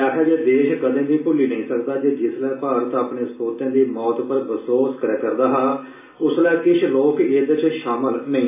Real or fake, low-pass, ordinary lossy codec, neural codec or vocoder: real; 3.6 kHz; AAC, 24 kbps; none